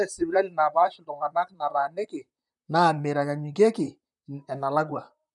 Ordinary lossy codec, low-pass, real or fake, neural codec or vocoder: none; 10.8 kHz; fake; vocoder, 44.1 kHz, 128 mel bands, Pupu-Vocoder